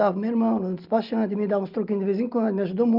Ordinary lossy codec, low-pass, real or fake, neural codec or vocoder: Opus, 24 kbps; 5.4 kHz; real; none